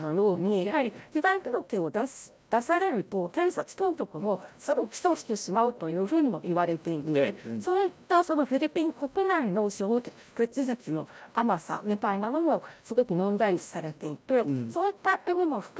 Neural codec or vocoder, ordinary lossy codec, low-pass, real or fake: codec, 16 kHz, 0.5 kbps, FreqCodec, larger model; none; none; fake